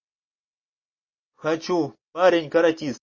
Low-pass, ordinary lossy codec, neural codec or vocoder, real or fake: 7.2 kHz; MP3, 32 kbps; none; real